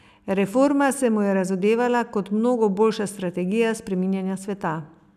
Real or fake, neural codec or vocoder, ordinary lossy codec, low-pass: real; none; none; 14.4 kHz